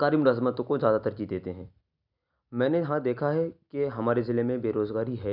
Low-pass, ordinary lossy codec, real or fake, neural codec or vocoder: 5.4 kHz; none; real; none